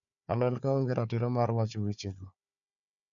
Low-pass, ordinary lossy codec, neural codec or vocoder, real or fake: 7.2 kHz; none; codec, 16 kHz, 4 kbps, FunCodec, trained on Chinese and English, 50 frames a second; fake